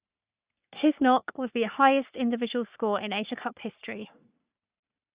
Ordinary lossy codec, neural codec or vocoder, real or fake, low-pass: Opus, 64 kbps; codec, 44.1 kHz, 3.4 kbps, Pupu-Codec; fake; 3.6 kHz